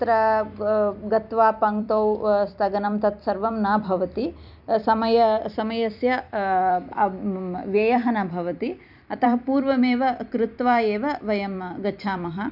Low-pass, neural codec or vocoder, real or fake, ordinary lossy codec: 5.4 kHz; vocoder, 44.1 kHz, 128 mel bands every 256 samples, BigVGAN v2; fake; none